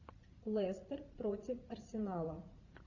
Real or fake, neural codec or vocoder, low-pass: fake; vocoder, 44.1 kHz, 80 mel bands, Vocos; 7.2 kHz